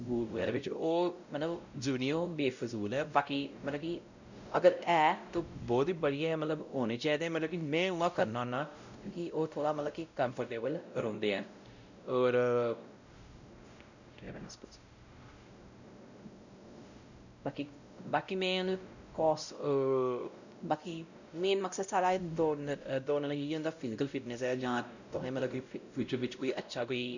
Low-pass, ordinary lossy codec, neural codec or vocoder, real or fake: 7.2 kHz; none; codec, 16 kHz, 0.5 kbps, X-Codec, WavLM features, trained on Multilingual LibriSpeech; fake